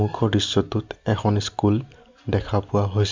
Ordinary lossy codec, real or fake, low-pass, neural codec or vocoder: MP3, 64 kbps; real; 7.2 kHz; none